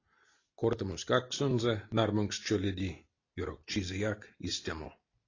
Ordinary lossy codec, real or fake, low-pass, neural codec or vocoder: AAC, 32 kbps; real; 7.2 kHz; none